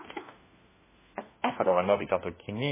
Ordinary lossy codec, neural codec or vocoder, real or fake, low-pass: MP3, 16 kbps; codec, 16 kHz, 1 kbps, FunCodec, trained on LibriTTS, 50 frames a second; fake; 3.6 kHz